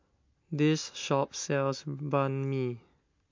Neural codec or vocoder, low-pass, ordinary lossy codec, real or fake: none; 7.2 kHz; MP3, 48 kbps; real